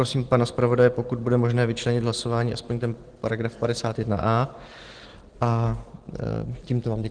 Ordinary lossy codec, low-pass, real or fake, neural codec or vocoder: Opus, 16 kbps; 9.9 kHz; real; none